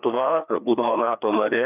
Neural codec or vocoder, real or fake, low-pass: codec, 16 kHz, 2 kbps, FreqCodec, larger model; fake; 3.6 kHz